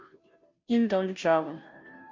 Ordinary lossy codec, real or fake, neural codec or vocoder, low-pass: MP3, 48 kbps; fake; codec, 16 kHz, 0.5 kbps, FunCodec, trained on Chinese and English, 25 frames a second; 7.2 kHz